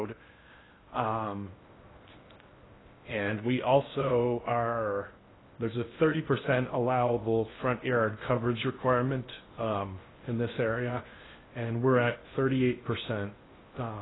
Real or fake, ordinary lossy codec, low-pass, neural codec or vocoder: fake; AAC, 16 kbps; 7.2 kHz; codec, 16 kHz in and 24 kHz out, 0.6 kbps, FocalCodec, streaming, 2048 codes